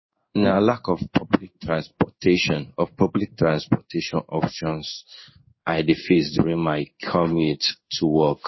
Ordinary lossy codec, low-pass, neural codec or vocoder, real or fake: MP3, 24 kbps; 7.2 kHz; codec, 16 kHz in and 24 kHz out, 1 kbps, XY-Tokenizer; fake